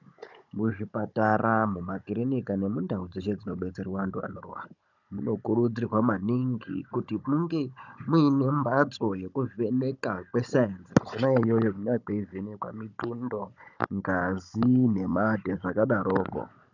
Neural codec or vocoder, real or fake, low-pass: codec, 16 kHz, 16 kbps, FunCodec, trained on Chinese and English, 50 frames a second; fake; 7.2 kHz